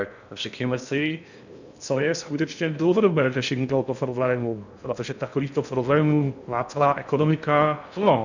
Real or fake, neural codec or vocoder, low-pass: fake; codec, 16 kHz in and 24 kHz out, 0.6 kbps, FocalCodec, streaming, 2048 codes; 7.2 kHz